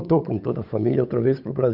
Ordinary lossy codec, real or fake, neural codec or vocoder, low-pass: none; fake; codec, 16 kHz, 8 kbps, FunCodec, trained on Chinese and English, 25 frames a second; 5.4 kHz